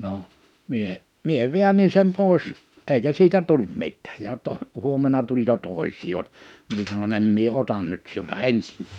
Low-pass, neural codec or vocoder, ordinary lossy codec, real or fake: 19.8 kHz; autoencoder, 48 kHz, 32 numbers a frame, DAC-VAE, trained on Japanese speech; none; fake